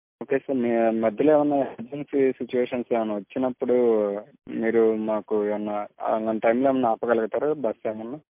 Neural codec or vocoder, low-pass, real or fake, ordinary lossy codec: none; 3.6 kHz; real; MP3, 32 kbps